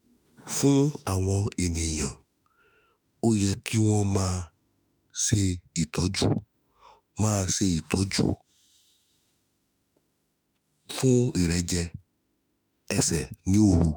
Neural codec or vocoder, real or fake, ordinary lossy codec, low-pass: autoencoder, 48 kHz, 32 numbers a frame, DAC-VAE, trained on Japanese speech; fake; none; none